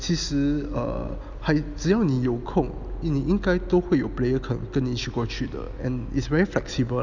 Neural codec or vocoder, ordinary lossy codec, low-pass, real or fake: none; none; 7.2 kHz; real